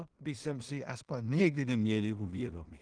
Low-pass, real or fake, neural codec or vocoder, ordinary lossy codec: 9.9 kHz; fake; codec, 16 kHz in and 24 kHz out, 0.4 kbps, LongCat-Audio-Codec, two codebook decoder; Opus, 24 kbps